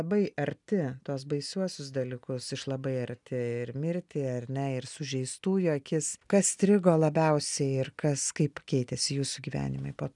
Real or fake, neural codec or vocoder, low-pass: real; none; 10.8 kHz